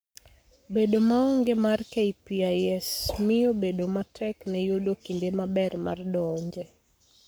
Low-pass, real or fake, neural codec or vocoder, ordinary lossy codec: none; fake; codec, 44.1 kHz, 7.8 kbps, Pupu-Codec; none